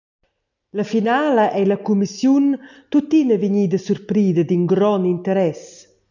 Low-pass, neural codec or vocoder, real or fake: 7.2 kHz; none; real